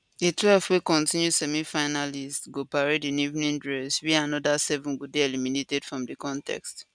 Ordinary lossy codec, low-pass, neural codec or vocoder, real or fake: none; 9.9 kHz; none; real